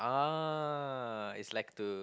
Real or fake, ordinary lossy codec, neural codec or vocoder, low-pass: real; none; none; none